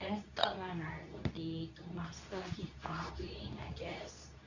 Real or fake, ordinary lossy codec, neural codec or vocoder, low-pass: fake; none; codec, 24 kHz, 0.9 kbps, WavTokenizer, medium speech release version 2; 7.2 kHz